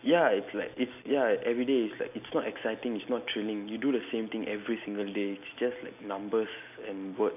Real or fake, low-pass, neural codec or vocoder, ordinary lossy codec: real; 3.6 kHz; none; none